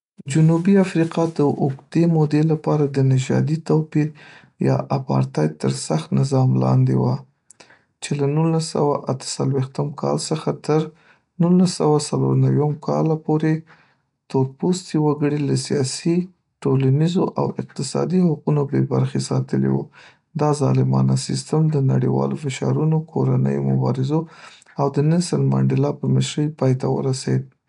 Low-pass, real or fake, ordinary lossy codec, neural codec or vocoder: 10.8 kHz; real; none; none